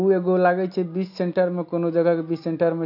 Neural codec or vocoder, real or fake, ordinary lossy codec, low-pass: none; real; none; 5.4 kHz